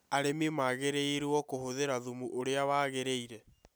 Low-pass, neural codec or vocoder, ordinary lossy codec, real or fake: none; none; none; real